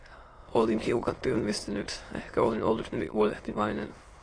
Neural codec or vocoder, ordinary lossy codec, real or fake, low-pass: autoencoder, 22.05 kHz, a latent of 192 numbers a frame, VITS, trained on many speakers; AAC, 32 kbps; fake; 9.9 kHz